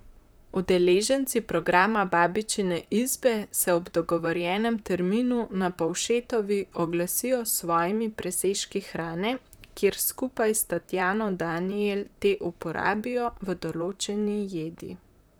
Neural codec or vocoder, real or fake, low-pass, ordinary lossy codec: vocoder, 44.1 kHz, 128 mel bands, Pupu-Vocoder; fake; none; none